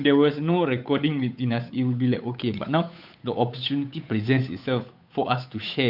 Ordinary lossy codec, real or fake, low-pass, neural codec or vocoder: none; fake; 5.4 kHz; codec, 16 kHz, 8 kbps, FunCodec, trained on Chinese and English, 25 frames a second